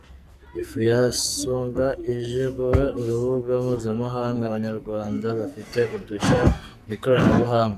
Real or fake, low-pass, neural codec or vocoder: fake; 14.4 kHz; codec, 44.1 kHz, 2.6 kbps, SNAC